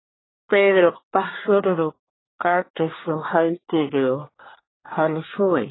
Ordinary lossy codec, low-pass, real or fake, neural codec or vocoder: AAC, 16 kbps; 7.2 kHz; fake; codec, 24 kHz, 1 kbps, SNAC